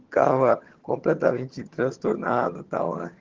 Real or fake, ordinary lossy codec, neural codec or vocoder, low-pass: fake; Opus, 16 kbps; vocoder, 22.05 kHz, 80 mel bands, HiFi-GAN; 7.2 kHz